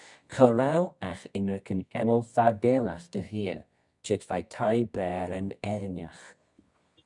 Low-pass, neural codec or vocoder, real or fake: 10.8 kHz; codec, 24 kHz, 0.9 kbps, WavTokenizer, medium music audio release; fake